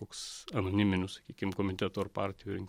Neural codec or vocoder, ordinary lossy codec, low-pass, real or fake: vocoder, 44.1 kHz, 128 mel bands every 256 samples, BigVGAN v2; MP3, 64 kbps; 19.8 kHz; fake